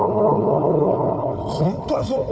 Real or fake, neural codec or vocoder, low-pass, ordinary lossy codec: fake; codec, 16 kHz, 4 kbps, FunCodec, trained on Chinese and English, 50 frames a second; none; none